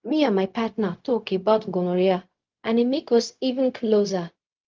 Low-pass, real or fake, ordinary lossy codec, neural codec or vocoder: 7.2 kHz; fake; Opus, 32 kbps; codec, 16 kHz, 0.4 kbps, LongCat-Audio-Codec